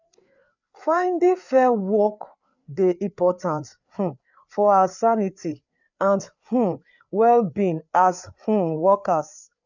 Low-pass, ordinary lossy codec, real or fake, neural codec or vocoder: 7.2 kHz; none; fake; codec, 16 kHz, 4 kbps, FreqCodec, larger model